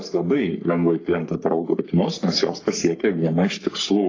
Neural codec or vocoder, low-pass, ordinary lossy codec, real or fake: codec, 44.1 kHz, 3.4 kbps, Pupu-Codec; 7.2 kHz; AAC, 32 kbps; fake